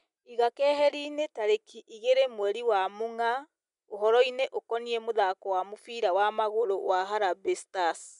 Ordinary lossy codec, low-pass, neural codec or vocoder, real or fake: MP3, 96 kbps; 10.8 kHz; none; real